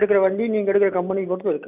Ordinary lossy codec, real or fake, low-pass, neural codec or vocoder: none; real; 3.6 kHz; none